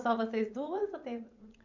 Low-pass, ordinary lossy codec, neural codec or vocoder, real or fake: 7.2 kHz; none; vocoder, 44.1 kHz, 80 mel bands, Vocos; fake